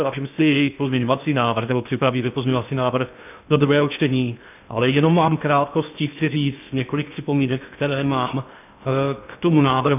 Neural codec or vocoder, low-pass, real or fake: codec, 16 kHz in and 24 kHz out, 0.6 kbps, FocalCodec, streaming, 2048 codes; 3.6 kHz; fake